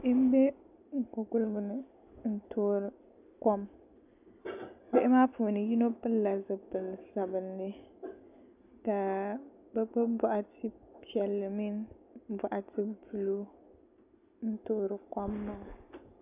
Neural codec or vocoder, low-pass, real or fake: none; 3.6 kHz; real